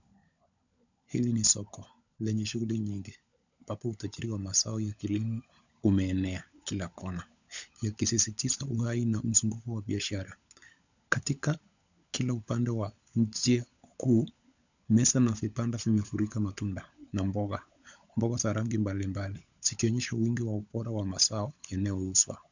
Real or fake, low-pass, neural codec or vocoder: fake; 7.2 kHz; codec, 16 kHz, 16 kbps, FunCodec, trained on LibriTTS, 50 frames a second